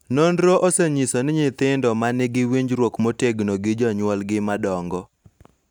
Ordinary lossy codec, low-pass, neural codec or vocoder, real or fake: none; 19.8 kHz; none; real